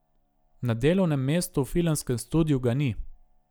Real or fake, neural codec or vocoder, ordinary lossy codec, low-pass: real; none; none; none